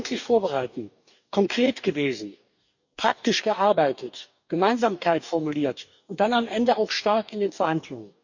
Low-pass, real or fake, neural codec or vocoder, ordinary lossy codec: 7.2 kHz; fake; codec, 44.1 kHz, 2.6 kbps, DAC; none